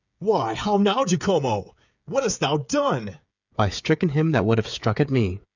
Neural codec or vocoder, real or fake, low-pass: codec, 16 kHz, 8 kbps, FreqCodec, smaller model; fake; 7.2 kHz